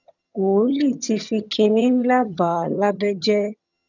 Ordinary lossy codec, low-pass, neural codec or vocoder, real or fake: none; 7.2 kHz; vocoder, 22.05 kHz, 80 mel bands, HiFi-GAN; fake